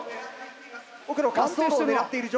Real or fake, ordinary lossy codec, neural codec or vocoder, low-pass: real; none; none; none